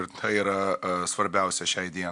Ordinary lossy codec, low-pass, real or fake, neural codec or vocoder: MP3, 64 kbps; 10.8 kHz; real; none